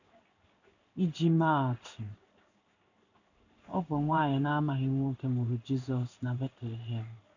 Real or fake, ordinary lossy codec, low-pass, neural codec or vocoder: fake; none; 7.2 kHz; codec, 16 kHz in and 24 kHz out, 1 kbps, XY-Tokenizer